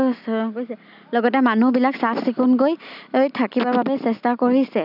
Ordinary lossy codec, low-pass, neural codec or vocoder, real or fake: none; 5.4 kHz; none; real